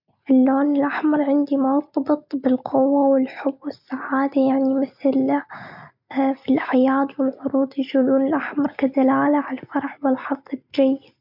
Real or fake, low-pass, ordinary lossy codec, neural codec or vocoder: real; 5.4 kHz; MP3, 48 kbps; none